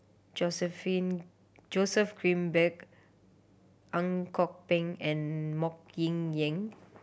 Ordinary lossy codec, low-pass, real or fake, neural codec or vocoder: none; none; real; none